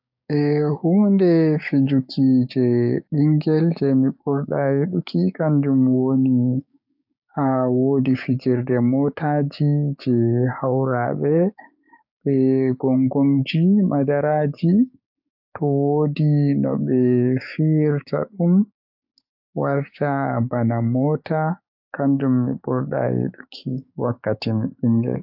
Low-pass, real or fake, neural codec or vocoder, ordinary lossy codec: 5.4 kHz; fake; codec, 16 kHz, 6 kbps, DAC; AAC, 48 kbps